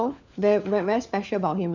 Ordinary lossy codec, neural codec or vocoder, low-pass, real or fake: none; codec, 16 kHz, 4 kbps, FunCodec, trained on LibriTTS, 50 frames a second; 7.2 kHz; fake